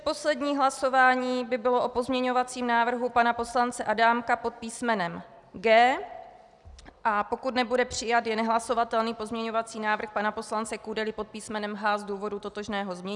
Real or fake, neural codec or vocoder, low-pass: real; none; 10.8 kHz